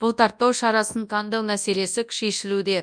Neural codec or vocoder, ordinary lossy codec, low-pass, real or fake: codec, 24 kHz, 0.9 kbps, WavTokenizer, large speech release; none; 9.9 kHz; fake